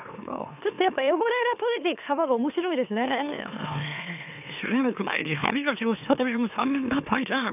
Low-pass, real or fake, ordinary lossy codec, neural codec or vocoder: 3.6 kHz; fake; none; autoencoder, 44.1 kHz, a latent of 192 numbers a frame, MeloTTS